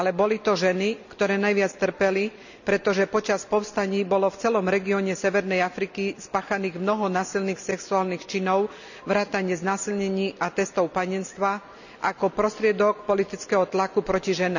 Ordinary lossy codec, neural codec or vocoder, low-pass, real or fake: none; none; 7.2 kHz; real